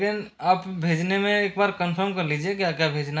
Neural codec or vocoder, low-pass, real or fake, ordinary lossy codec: none; none; real; none